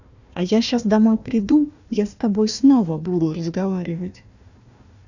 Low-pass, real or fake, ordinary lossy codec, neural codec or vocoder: 7.2 kHz; fake; none; codec, 16 kHz, 1 kbps, FunCodec, trained on Chinese and English, 50 frames a second